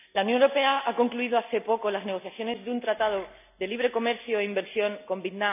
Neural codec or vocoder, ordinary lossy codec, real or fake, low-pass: none; none; real; 3.6 kHz